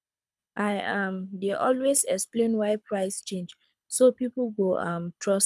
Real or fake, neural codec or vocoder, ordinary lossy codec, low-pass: fake; codec, 24 kHz, 6 kbps, HILCodec; none; none